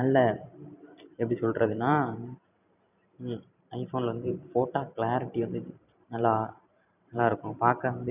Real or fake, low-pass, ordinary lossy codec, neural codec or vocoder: fake; 3.6 kHz; none; vocoder, 44.1 kHz, 128 mel bands every 512 samples, BigVGAN v2